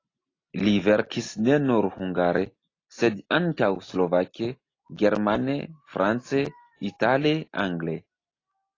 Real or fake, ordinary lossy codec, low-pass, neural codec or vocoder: real; AAC, 32 kbps; 7.2 kHz; none